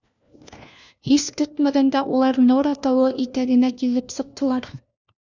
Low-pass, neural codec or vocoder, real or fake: 7.2 kHz; codec, 16 kHz, 1 kbps, FunCodec, trained on LibriTTS, 50 frames a second; fake